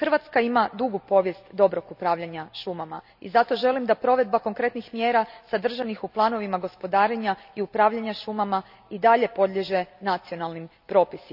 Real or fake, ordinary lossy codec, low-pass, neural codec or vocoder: real; none; 5.4 kHz; none